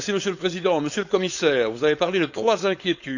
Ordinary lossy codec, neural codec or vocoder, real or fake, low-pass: none; codec, 16 kHz, 4.8 kbps, FACodec; fake; 7.2 kHz